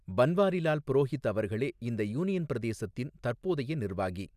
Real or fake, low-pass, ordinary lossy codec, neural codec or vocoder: real; 14.4 kHz; none; none